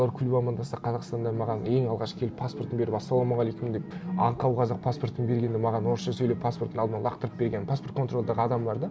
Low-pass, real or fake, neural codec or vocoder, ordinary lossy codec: none; real; none; none